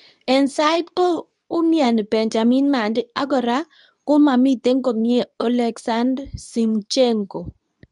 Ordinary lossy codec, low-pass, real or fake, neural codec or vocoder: none; 10.8 kHz; fake; codec, 24 kHz, 0.9 kbps, WavTokenizer, medium speech release version 2